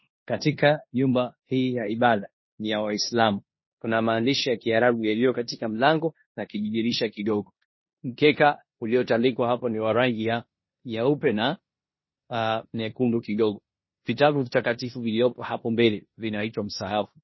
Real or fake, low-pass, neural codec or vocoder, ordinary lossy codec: fake; 7.2 kHz; codec, 16 kHz in and 24 kHz out, 0.9 kbps, LongCat-Audio-Codec, four codebook decoder; MP3, 24 kbps